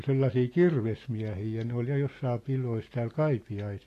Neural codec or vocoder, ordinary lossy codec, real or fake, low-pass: none; AAC, 48 kbps; real; 14.4 kHz